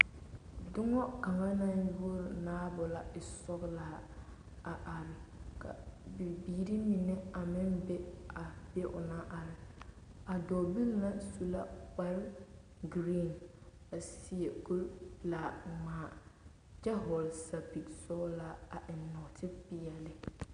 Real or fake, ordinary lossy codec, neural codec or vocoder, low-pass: real; MP3, 96 kbps; none; 9.9 kHz